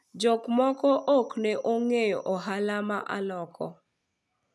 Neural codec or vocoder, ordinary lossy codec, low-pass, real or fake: none; none; none; real